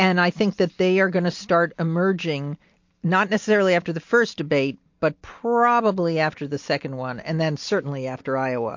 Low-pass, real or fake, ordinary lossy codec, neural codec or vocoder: 7.2 kHz; real; MP3, 48 kbps; none